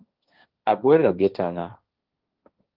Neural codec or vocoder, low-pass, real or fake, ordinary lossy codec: codec, 16 kHz, 1.1 kbps, Voila-Tokenizer; 5.4 kHz; fake; Opus, 32 kbps